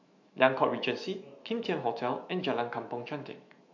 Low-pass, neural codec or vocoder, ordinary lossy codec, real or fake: 7.2 kHz; autoencoder, 48 kHz, 128 numbers a frame, DAC-VAE, trained on Japanese speech; MP3, 48 kbps; fake